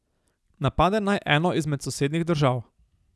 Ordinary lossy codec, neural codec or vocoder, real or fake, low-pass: none; none; real; none